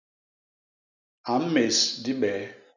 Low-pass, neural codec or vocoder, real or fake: 7.2 kHz; none; real